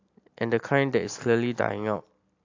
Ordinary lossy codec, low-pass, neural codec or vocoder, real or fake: AAC, 48 kbps; 7.2 kHz; none; real